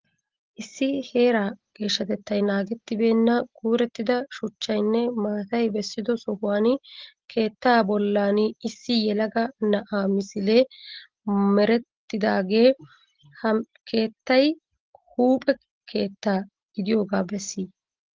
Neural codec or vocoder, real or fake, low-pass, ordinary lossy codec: none; real; 7.2 kHz; Opus, 16 kbps